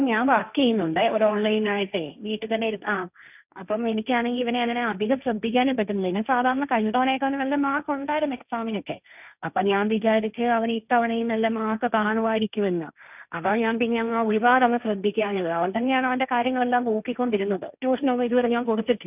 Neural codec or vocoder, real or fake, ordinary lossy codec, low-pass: codec, 16 kHz, 1.1 kbps, Voila-Tokenizer; fake; none; 3.6 kHz